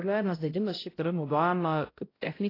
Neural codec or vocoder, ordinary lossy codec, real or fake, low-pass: codec, 16 kHz, 0.5 kbps, X-Codec, HuBERT features, trained on balanced general audio; AAC, 24 kbps; fake; 5.4 kHz